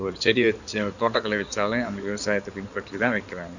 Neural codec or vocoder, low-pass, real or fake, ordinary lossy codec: codec, 16 kHz in and 24 kHz out, 2.2 kbps, FireRedTTS-2 codec; 7.2 kHz; fake; none